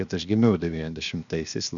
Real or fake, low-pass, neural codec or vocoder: fake; 7.2 kHz; codec, 16 kHz, 0.7 kbps, FocalCodec